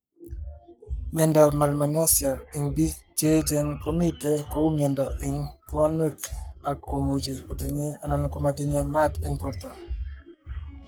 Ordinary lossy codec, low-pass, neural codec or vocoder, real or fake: none; none; codec, 44.1 kHz, 3.4 kbps, Pupu-Codec; fake